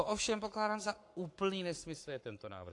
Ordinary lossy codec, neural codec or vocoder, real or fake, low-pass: AAC, 48 kbps; codec, 44.1 kHz, 7.8 kbps, Pupu-Codec; fake; 10.8 kHz